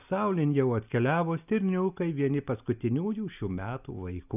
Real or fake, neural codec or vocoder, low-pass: real; none; 3.6 kHz